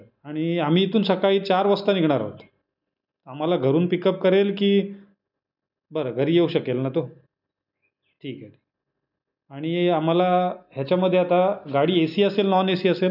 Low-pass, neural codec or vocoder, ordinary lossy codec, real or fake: 5.4 kHz; none; none; real